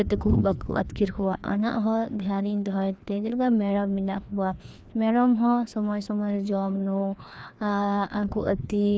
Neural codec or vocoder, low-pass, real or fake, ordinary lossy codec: codec, 16 kHz, 2 kbps, FreqCodec, larger model; none; fake; none